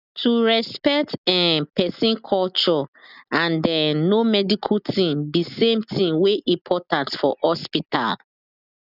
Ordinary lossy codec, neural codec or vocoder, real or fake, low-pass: none; none; real; 5.4 kHz